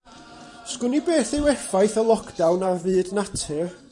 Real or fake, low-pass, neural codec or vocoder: fake; 10.8 kHz; vocoder, 44.1 kHz, 128 mel bands every 512 samples, BigVGAN v2